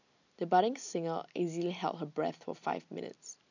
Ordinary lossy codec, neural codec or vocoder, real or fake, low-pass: none; none; real; 7.2 kHz